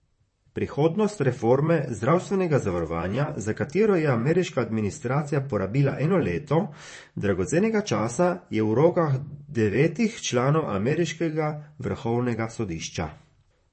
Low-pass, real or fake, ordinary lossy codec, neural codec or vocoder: 9.9 kHz; fake; MP3, 32 kbps; vocoder, 44.1 kHz, 128 mel bands, Pupu-Vocoder